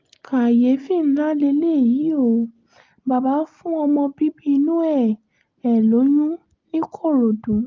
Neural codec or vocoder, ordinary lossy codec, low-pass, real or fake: none; Opus, 24 kbps; 7.2 kHz; real